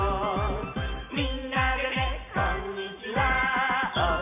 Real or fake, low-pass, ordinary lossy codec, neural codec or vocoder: real; 3.6 kHz; none; none